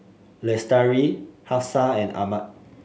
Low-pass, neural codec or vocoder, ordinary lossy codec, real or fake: none; none; none; real